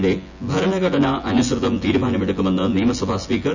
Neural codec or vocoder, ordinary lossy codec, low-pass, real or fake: vocoder, 24 kHz, 100 mel bands, Vocos; none; 7.2 kHz; fake